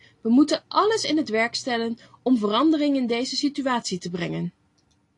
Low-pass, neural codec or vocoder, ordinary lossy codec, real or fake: 10.8 kHz; none; AAC, 48 kbps; real